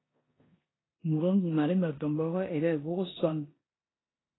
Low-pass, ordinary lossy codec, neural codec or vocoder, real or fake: 7.2 kHz; AAC, 16 kbps; codec, 16 kHz in and 24 kHz out, 0.9 kbps, LongCat-Audio-Codec, four codebook decoder; fake